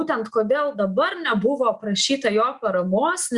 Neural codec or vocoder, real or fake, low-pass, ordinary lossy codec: none; real; 10.8 kHz; Opus, 64 kbps